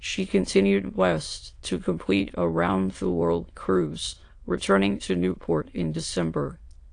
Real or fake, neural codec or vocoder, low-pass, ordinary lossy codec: fake; autoencoder, 22.05 kHz, a latent of 192 numbers a frame, VITS, trained on many speakers; 9.9 kHz; AAC, 48 kbps